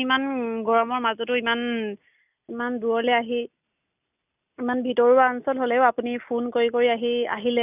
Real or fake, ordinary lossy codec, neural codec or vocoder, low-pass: real; none; none; 3.6 kHz